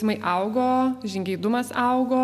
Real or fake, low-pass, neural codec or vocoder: real; 14.4 kHz; none